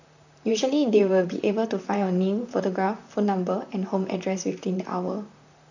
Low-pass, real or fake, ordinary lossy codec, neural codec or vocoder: 7.2 kHz; fake; none; vocoder, 44.1 kHz, 128 mel bands, Pupu-Vocoder